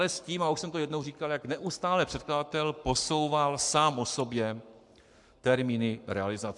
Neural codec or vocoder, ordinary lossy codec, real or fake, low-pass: codec, 44.1 kHz, 7.8 kbps, Pupu-Codec; MP3, 96 kbps; fake; 10.8 kHz